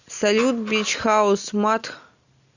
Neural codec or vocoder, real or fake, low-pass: none; real; 7.2 kHz